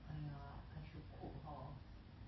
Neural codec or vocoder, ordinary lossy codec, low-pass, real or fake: none; MP3, 24 kbps; 7.2 kHz; real